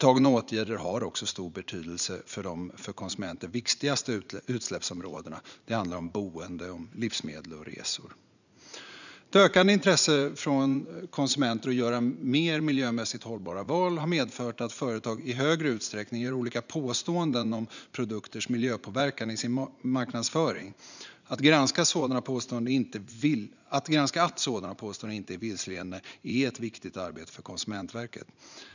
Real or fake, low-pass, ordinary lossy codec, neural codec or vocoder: fake; 7.2 kHz; none; vocoder, 44.1 kHz, 80 mel bands, Vocos